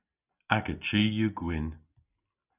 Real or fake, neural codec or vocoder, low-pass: real; none; 3.6 kHz